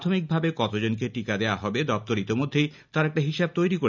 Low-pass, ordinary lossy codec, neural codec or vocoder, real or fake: 7.2 kHz; none; none; real